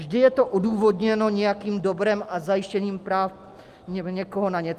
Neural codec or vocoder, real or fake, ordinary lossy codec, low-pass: none; real; Opus, 32 kbps; 14.4 kHz